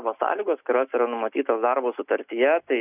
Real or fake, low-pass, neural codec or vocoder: real; 3.6 kHz; none